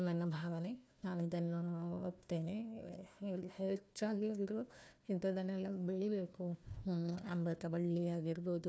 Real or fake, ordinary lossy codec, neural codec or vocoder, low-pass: fake; none; codec, 16 kHz, 1 kbps, FunCodec, trained on Chinese and English, 50 frames a second; none